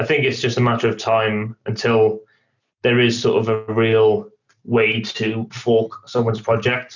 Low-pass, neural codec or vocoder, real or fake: 7.2 kHz; none; real